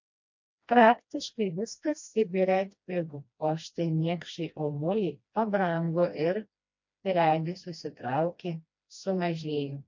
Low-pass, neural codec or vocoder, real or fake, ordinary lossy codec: 7.2 kHz; codec, 16 kHz, 1 kbps, FreqCodec, smaller model; fake; MP3, 48 kbps